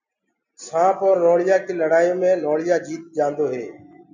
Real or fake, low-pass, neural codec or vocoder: real; 7.2 kHz; none